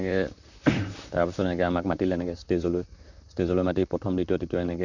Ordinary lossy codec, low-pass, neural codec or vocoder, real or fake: none; 7.2 kHz; vocoder, 44.1 kHz, 128 mel bands, Pupu-Vocoder; fake